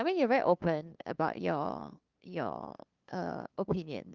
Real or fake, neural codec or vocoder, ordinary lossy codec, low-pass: fake; codec, 16 kHz, 2 kbps, FunCodec, trained on LibriTTS, 25 frames a second; Opus, 24 kbps; 7.2 kHz